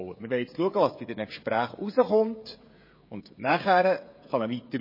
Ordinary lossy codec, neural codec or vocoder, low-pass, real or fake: MP3, 24 kbps; codec, 16 kHz, 16 kbps, FreqCodec, smaller model; 5.4 kHz; fake